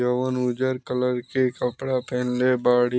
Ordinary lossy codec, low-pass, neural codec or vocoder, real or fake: none; none; none; real